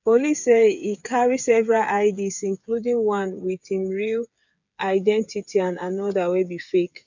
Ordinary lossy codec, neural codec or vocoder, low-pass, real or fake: none; codec, 16 kHz, 8 kbps, FreqCodec, smaller model; 7.2 kHz; fake